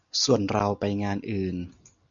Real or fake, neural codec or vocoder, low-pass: real; none; 7.2 kHz